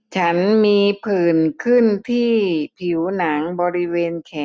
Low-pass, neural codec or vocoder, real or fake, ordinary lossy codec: none; none; real; none